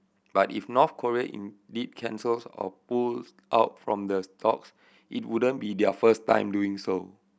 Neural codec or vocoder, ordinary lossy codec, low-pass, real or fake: none; none; none; real